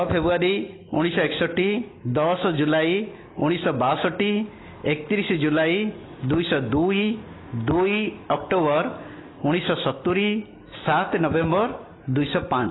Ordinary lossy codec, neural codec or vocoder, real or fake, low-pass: AAC, 16 kbps; none; real; 7.2 kHz